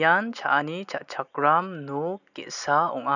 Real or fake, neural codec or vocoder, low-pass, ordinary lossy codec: real; none; 7.2 kHz; none